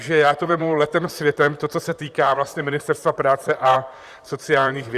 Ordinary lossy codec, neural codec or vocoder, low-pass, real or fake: Opus, 64 kbps; vocoder, 44.1 kHz, 128 mel bands, Pupu-Vocoder; 14.4 kHz; fake